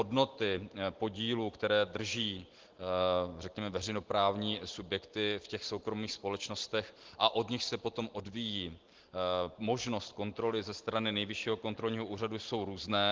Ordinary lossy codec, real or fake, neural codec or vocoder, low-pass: Opus, 16 kbps; real; none; 7.2 kHz